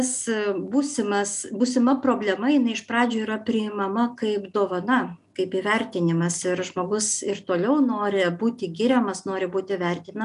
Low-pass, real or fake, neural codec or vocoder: 10.8 kHz; real; none